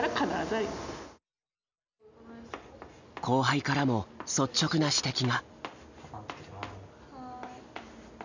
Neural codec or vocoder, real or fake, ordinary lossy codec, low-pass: none; real; none; 7.2 kHz